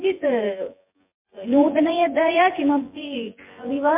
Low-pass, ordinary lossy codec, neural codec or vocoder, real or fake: 3.6 kHz; MP3, 32 kbps; vocoder, 24 kHz, 100 mel bands, Vocos; fake